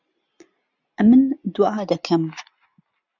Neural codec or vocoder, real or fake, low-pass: none; real; 7.2 kHz